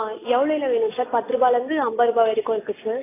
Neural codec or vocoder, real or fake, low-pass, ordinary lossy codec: none; real; 3.6 kHz; AAC, 16 kbps